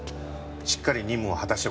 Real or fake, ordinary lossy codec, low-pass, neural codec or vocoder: real; none; none; none